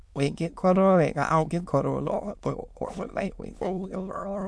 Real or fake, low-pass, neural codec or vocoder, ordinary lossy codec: fake; none; autoencoder, 22.05 kHz, a latent of 192 numbers a frame, VITS, trained on many speakers; none